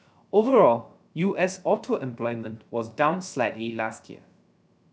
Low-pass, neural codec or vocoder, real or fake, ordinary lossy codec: none; codec, 16 kHz, 0.3 kbps, FocalCodec; fake; none